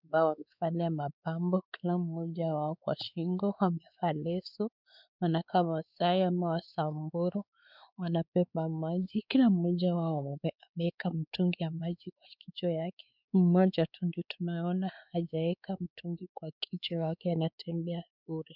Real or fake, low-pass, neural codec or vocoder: fake; 5.4 kHz; codec, 16 kHz, 4 kbps, X-Codec, WavLM features, trained on Multilingual LibriSpeech